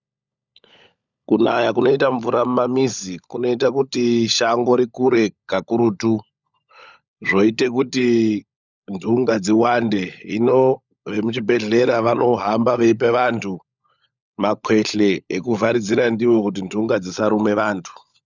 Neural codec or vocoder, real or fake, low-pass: codec, 16 kHz, 16 kbps, FunCodec, trained on LibriTTS, 50 frames a second; fake; 7.2 kHz